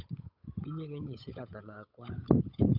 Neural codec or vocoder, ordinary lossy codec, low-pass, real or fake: codec, 16 kHz, 8 kbps, FunCodec, trained on Chinese and English, 25 frames a second; none; 5.4 kHz; fake